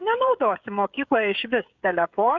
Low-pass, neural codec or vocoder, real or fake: 7.2 kHz; codec, 16 kHz, 16 kbps, FreqCodec, smaller model; fake